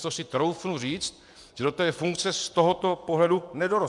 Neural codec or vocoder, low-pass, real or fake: vocoder, 24 kHz, 100 mel bands, Vocos; 10.8 kHz; fake